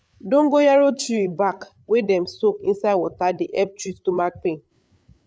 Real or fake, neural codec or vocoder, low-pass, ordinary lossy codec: fake; codec, 16 kHz, 8 kbps, FreqCodec, larger model; none; none